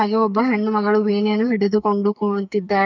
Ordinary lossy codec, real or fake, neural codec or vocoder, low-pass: none; fake; codec, 44.1 kHz, 2.6 kbps, SNAC; 7.2 kHz